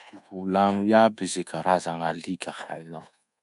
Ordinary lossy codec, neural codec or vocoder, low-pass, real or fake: none; codec, 24 kHz, 1.2 kbps, DualCodec; 10.8 kHz; fake